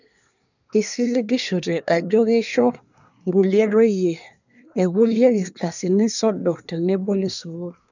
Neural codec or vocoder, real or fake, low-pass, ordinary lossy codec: codec, 24 kHz, 1 kbps, SNAC; fake; 7.2 kHz; none